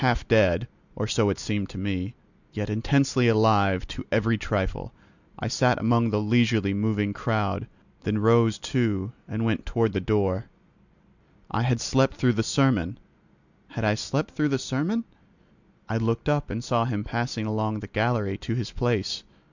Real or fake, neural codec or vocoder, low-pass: real; none; 7.2 kHz